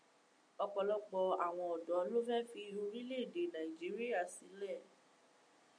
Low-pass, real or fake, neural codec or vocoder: 9.9 kHz; real; none